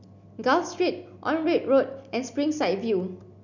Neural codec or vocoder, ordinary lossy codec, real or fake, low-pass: none; none; real; 7.2 kHz